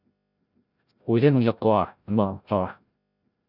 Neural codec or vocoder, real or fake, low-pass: codec, 16 kHz, 0.5 kbps, FreqCodec, larger model; fake; 5.4 kHz